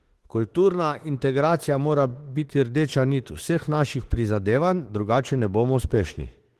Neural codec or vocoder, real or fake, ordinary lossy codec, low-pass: autoencoder, 48 kHz, 32 numbers a frame, DAC-VAE, trained on Japanese speech; fake; Opus, 16 kbps; 14.4 kHz